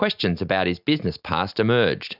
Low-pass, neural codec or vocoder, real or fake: 5.4 kHz; none; real